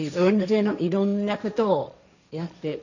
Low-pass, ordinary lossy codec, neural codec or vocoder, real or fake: 7.2 kHz; none; codec, 16 kHz, 1.1 kbps, Voila-Tokenizer; fake